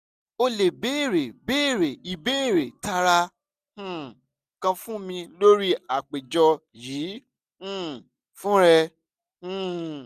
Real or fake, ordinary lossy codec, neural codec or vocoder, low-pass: real; none; none; 14.4 kHz